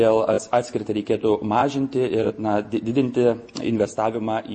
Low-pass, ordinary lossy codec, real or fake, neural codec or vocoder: 10.8 kHz; MP3, 32 kbps; real; none